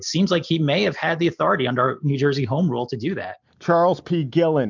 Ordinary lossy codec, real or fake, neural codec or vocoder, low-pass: MP3, 64 kbps; real; none; 7.2 kHz